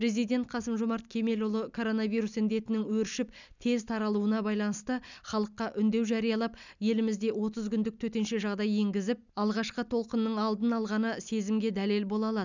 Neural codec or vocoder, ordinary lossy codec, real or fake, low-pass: none; none; real; 7.2 kHz